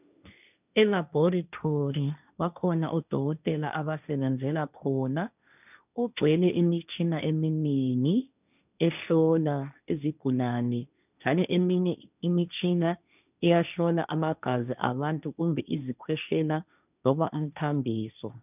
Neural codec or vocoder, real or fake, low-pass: codec, 16 kHz, 1.1 kbps, Voila-Tokenizer; fake; 3.6 kHz